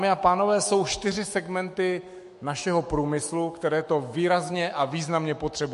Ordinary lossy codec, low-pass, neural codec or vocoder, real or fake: MP3, 48 kbps; 14.4 kHz; autoencoder, 48 kHz, 128 numbers a frame, DAC-VAE, trained on Japanese speech; fake